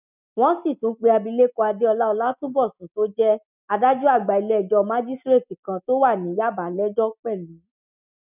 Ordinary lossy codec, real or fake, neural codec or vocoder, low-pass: none; real; none; 3.6 kHz